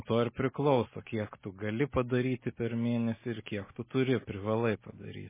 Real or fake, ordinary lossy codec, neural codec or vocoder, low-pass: real; MP3, 16 kbps; none; 3.6 kHz